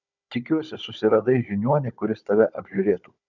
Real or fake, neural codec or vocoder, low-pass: fake; codec, 16 kHz, 16 kbps, FunCodec, trained on Chinese and English, 50 frames a second; 7.2 kHz